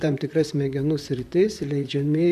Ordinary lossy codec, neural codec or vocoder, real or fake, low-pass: MP3, 96 kbps; vocoder, 44.1 kHz, 128 mel bands, Pupu-Vocoder; fake; 14.4 kHz